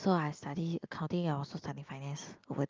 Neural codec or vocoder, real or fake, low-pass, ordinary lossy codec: none; real; 7.2 kHz; Opus, 24 kbps